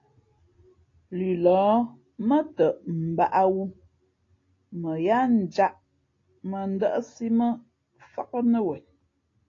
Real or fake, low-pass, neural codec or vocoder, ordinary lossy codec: real; 7.2 kHz; none; AAC, 32 kbps